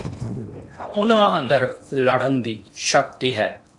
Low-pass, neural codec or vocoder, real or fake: 10.8 kHz; codec, 16 kHz in and 24 kHz out, 0.8 kbps, FocalCodec, streaming, 65536 codes; fake